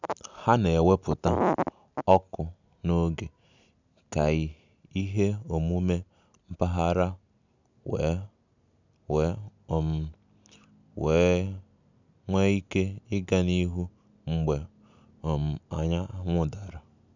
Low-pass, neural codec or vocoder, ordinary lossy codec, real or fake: 7.2 kHz; none; none; real